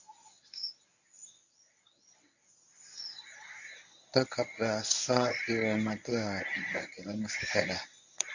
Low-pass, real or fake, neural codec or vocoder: 7.2 kHz; fake; codec, 24 kHz, 0.9 kbps, WavTokenizer, medium speech release version 1